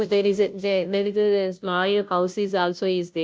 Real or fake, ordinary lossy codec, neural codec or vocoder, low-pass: fake; none; codec, 16 kHz, 0.5 kbps, FunCodec, trained on Chinese and English, 25 frames a second; none